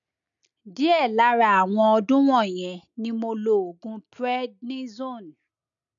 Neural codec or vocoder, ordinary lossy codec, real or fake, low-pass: none; none; real; 7.2 kHz